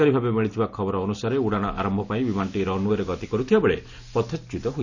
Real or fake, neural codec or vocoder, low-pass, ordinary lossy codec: real; none; 7.2 kHz; none